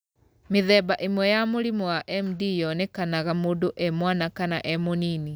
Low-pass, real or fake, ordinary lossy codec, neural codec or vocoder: none; real; none; none